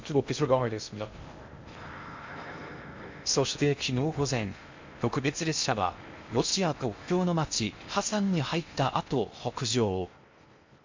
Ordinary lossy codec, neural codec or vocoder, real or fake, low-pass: MP3, 64 kbps; codec, 16 kHz in and 24 kHz out, 0.6 kbps, FocalCodec, streaming, 2048 codes; fake; 7.2 kHz